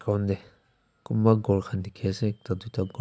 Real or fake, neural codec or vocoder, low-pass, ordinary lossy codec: real; none; none; none